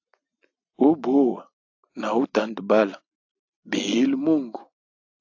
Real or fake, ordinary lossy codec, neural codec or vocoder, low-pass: real; AAC, 48 kbps; none; 7.2 kHz